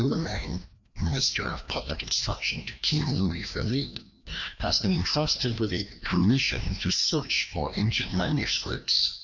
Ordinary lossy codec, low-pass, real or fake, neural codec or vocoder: MP3, 64 kbps; 7.2 kHz; fake; codec, 16 kHz, 1 kbps, FreqCodec, larger model